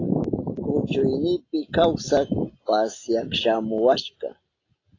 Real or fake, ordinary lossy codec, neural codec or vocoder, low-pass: real; AAC, 32 kbps; none; 7.2 kHz